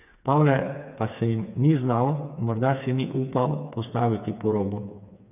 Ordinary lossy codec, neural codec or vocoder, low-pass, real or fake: none; codec, 16 kHz, 4 kbps, FreqCodec, smaller model; 3.6 kHz; fake